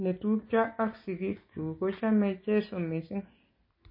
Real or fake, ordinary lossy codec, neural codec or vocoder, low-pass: real; MP3, 24 kbps; none; 5.4 kHz